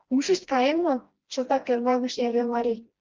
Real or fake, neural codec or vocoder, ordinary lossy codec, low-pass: fake; codec, 16 kHz, 1 kbps, FreqCodec, smaller model; Opus, 32 kbps; 7.2 kHz